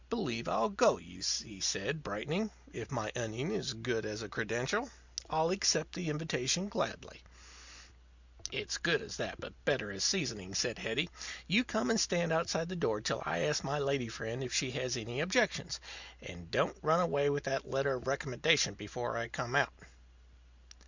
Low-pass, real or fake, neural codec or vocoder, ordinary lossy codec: 7.2 kHz; real; none; Opus, 64 kbps